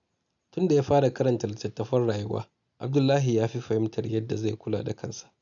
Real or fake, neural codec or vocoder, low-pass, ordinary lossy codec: real; none; 7.2 kHz; none